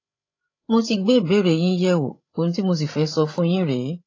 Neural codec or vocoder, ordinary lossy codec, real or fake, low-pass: codec, 16 kHz, 16 kbps, FreqCodec, larger model; AAC, 32 kbps; fake; 7.2 kHz